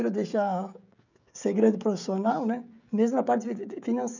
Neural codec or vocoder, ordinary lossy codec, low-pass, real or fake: codec, 16 kHz, 16 kbps, FreqCodec, smaller model; none; 7.2 kHz; fake